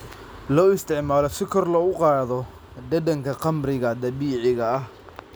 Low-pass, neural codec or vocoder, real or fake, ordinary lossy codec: none; none; real; none